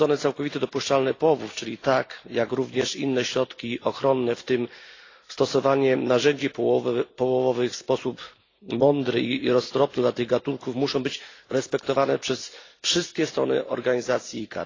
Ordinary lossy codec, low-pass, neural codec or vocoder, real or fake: AAC, 32 kbps; 7.2 kHz; none; real